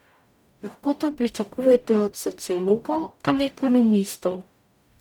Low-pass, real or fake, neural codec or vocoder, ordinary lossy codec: 19.8 kHz; fake; codec, 44.1 kHz, 0.9 kbps, DAC; none